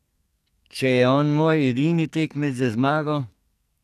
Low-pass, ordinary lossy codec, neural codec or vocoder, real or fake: 14.4 kHz; none; codec, 44.1 kHz, 2.6 kbps, SNAC; fake